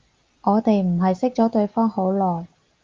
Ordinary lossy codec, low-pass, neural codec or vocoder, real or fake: Opus, 24 kbps; 7.2 kHz; none; real